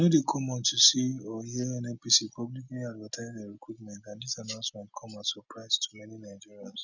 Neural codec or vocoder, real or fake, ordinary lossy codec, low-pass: none; real; none; 7.2 kHz